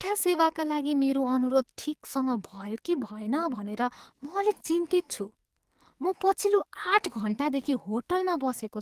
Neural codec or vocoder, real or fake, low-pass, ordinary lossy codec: codec, 44.1 kHz, 2.6 kbps, SNAC; fake; 14.4 kHz; Opus, 24 kbps